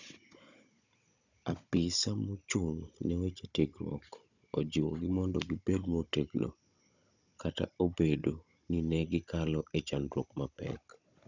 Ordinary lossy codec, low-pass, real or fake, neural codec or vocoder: none; 7.2 kHz; fake; codec, 16 kHz, 16 kbps, FunCodec, trained on Chinese and English, 50 frames a second